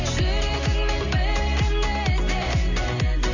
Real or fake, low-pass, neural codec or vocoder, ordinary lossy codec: real; 7.2 kHz; none; none